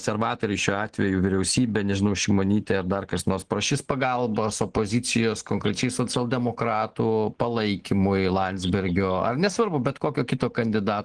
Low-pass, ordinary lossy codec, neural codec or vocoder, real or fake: 9.9 kHz; Opus, 16 kbps; none; real